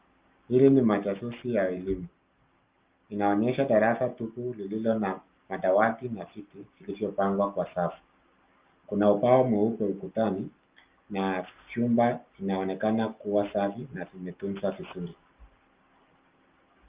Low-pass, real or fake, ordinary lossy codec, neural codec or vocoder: 3.6 kHz; real; Opus, 32 kbps; none